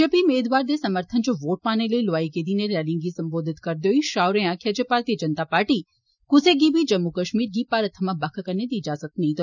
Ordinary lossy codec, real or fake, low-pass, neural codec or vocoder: none; real; 7.2 kHz; none